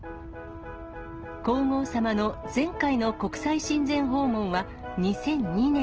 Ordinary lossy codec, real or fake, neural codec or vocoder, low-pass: Opus, 16 kbps; real; none; 7.2 kHz